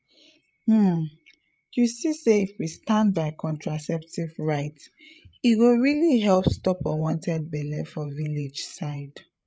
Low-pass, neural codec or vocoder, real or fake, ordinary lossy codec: none; codec, 16 kHz, 8 kbps, FreqCodec, larger model; fake; none